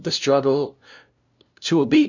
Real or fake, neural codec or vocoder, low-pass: fake; codec, 16 kHz, 0.5 kbps, FunCodec, trained on LibriTTS, 25 frames a second; 7.2 kHz